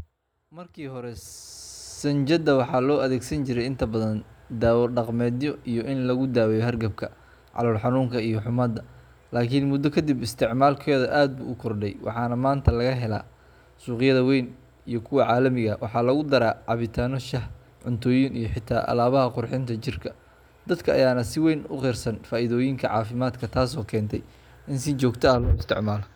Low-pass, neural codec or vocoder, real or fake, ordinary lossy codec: 19.8 kHz; none; real; none